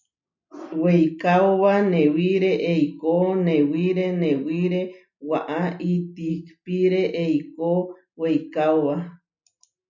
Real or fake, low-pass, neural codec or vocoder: real; 7.2 kHz; none